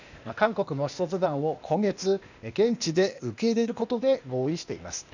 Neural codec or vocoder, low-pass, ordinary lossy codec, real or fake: codec, 16 kHz, 0.8 kbps, ZipCodec; 7.2 kHz; none; fake